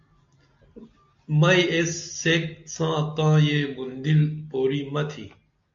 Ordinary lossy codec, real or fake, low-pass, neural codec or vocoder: AAC, 64 kbps; real; 7.2 kHz; none